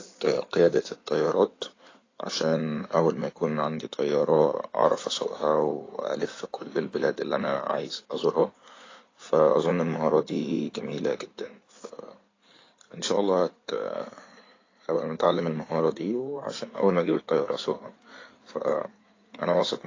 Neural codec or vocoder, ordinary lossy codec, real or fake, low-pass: codec, 16 kHz in and 24 kHz out, 2.2 kbps, FireRedTTS-2 codec; AAC, 32 kbps; fake; 7.2 kHz